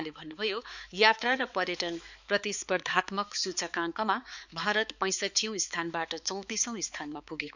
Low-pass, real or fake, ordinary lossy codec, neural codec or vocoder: 7.2 kHz; fake; none; codec, 16 kHz, 4 kbps, X-Codec, HuBERT features, trained on balanced general audio